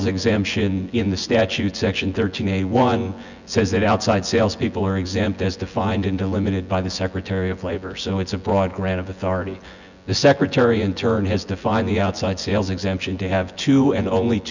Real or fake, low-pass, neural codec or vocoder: fake; 7.2 kHz; vocoder, 24 kHz, 100 mel bands, Vocos